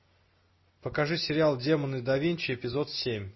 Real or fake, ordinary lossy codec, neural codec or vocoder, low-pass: real; MP3, 24 kbps; none; 7.2 kHz